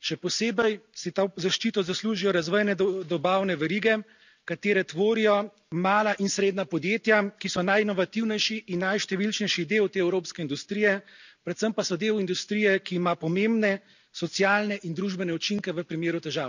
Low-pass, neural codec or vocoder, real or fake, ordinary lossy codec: 7.2 kHz; none; real; none